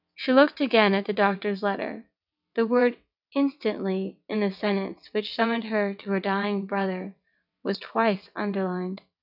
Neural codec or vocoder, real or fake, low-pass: vocoder, 22.05 kHz, 80 mel bands, WaveNeXt; fake; 5.4 kHz